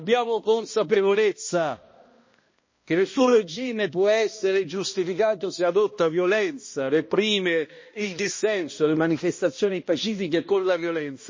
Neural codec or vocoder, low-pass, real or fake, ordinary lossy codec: codec, 16 kHz, 1 kbps, X-Codec, HuBERT features, trained on balanced general audio; 7.2 kHz; fake; MP3, 32 kbps